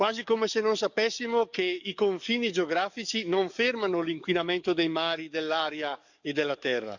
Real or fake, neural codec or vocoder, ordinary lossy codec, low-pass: fake; codec, 44.1 kHz, 7.8 kbps, DAC; none; 7.2 kHz